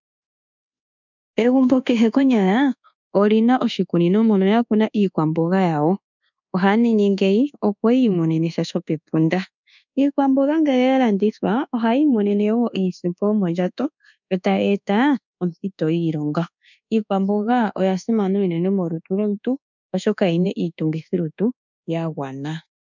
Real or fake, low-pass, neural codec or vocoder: fake; 7.2 kHz; codec, 24 kHz, 1.2 kbps, DualCodec